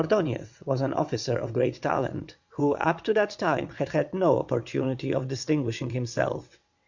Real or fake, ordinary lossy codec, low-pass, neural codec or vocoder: fake; Opus, 64 kbps; 7.2 kHz; vocoder, 22.05 kHz, 80 mel bands, WaveNeXt